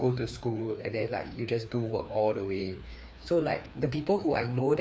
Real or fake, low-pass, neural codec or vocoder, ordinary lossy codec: fake; none; codec, 16 kHz, 2 kbps, FreqCodec, larger model; none